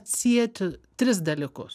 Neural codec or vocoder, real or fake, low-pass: none; real; 14.4 kHz